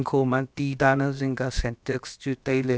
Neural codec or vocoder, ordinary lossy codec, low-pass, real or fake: codec, 16 kHz, about 1 kbps, DyCAST, with the encoder's durations; none; none; fake